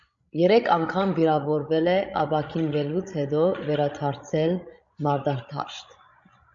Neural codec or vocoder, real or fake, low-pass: codec, 16 kHz, 16 kbps, FreqCodec, larger model; fake; 7.2 kHz